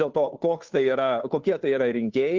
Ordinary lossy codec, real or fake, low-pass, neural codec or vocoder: Opus, 32 kbps; fake; 7.2 kHz; codec, 16 kHz, 2 kbps, FunCodec, trained on Chinese and English, 25 frames a second